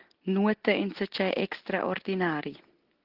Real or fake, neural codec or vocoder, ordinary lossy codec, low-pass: real; none; Opus, 16 kbps; 5.4 kHz